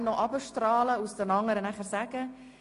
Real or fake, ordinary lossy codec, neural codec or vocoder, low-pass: real; AAC, 48 kbps; none; 10.8 kHz